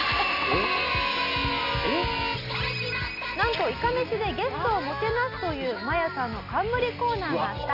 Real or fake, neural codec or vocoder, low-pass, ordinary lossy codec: real; none; 5.4 kHz; none